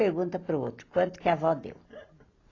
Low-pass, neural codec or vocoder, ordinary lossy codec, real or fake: 7.2 kHz; none; AAC, 32 kbps; real